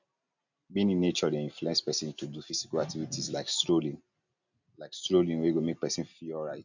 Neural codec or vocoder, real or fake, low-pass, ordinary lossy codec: none; real; 7.2 kHz; none